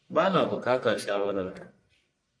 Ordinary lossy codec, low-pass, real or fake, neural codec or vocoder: MP3, 48 kbps; 9.9 kHz; fake; codec, 44.1 kHz, 1.7 kbps, Pupu-Codec